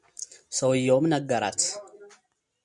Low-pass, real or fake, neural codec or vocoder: 10.8 kHz; real; none